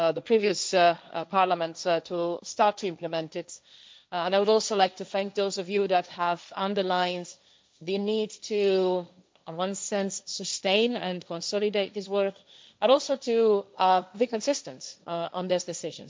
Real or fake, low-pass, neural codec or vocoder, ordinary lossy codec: fake; none; codec, 16 kHz, 1.1 kbps, Voila-Tokenizer; none